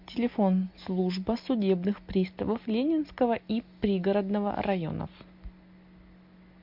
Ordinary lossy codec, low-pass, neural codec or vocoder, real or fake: MP3, 48 kbps; 5.4 kHz; none; real